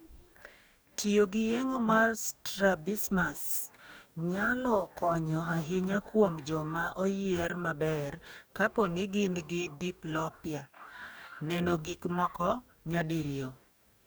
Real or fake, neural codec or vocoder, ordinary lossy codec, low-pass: fake; codec, 44.1 kHz, 2.6 kbps, DAC; none; none